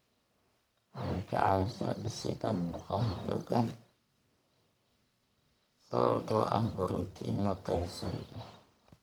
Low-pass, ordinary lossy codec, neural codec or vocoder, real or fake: none; none; codec, 44.1 kHz, 1.7 kbps, Pupu-Codec; fake